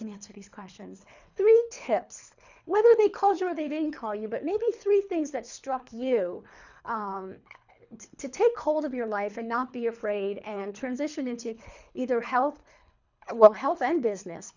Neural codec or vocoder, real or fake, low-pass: codec, 24 kHz, 3 kbps, HILCodec; fake; 7.2 kHz